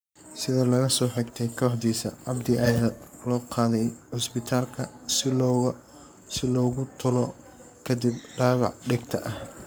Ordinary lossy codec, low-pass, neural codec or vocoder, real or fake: none; none; codec, 44.1 kHz, 7.8 kbps, Pupu-Codec; fake